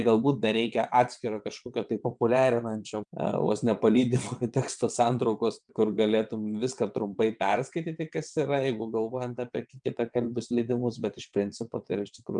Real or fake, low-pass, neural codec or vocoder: fake; 9.9 kHz; vocoder, 22.05 kHz, 80 mel bands, WaveNeXt